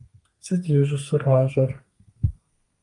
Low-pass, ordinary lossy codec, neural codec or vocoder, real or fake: 10.8 kHz; Opus, 32 kbps; codec, 32 kHz, 1.9 kbps, SNAC; fake